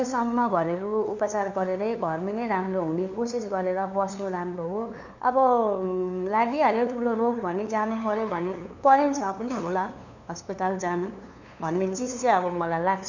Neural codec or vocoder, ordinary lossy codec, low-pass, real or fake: codec, 16 kHz, 2 kbps, FunCodec, trained on LibriTTS, 25 frames a second; none; 7.2 kHz; fake